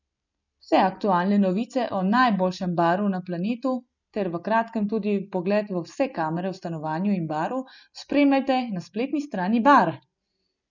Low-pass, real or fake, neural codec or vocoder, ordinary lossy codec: 7.2 kHz; real; none; none